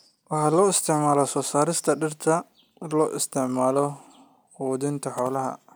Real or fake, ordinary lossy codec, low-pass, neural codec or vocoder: real; none; none; none